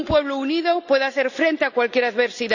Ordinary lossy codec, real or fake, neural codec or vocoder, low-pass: none; real; none; 7.2 kHz